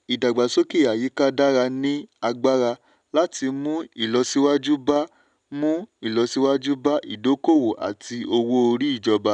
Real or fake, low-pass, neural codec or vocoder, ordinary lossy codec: real; 9.9 kHz; none; none